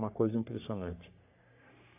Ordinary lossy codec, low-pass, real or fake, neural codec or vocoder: AAC, 32 kbps; 3.6 kHz; fake; codec, 44.1 kHz, 3.4 kbps, Pupu-Codec